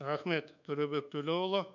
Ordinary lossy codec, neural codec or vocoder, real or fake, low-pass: none; codec, 24 kHz, 1.2 kbps, DualCodec; fake; 7.2 kHz